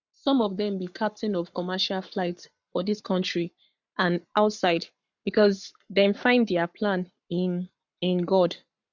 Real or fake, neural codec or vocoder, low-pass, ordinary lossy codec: fake; codec, 44.1 kHz, 7.8 kbps, Pupu-Codec; 7.2 kHz; none